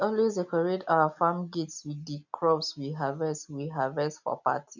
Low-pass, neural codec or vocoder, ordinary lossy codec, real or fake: 7.2 kHz; none; none; real